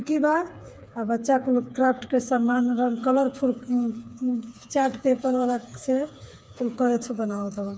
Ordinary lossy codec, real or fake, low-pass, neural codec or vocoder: none; fake; none; codec, 16 kHz, 4 kbps, FreqCodec, smaller model